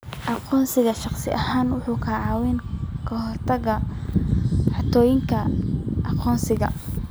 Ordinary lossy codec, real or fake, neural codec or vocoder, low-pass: none; real; none; none